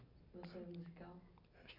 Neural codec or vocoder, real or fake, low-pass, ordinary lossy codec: none; real; 5.4 kHz; MP3, 48 kbps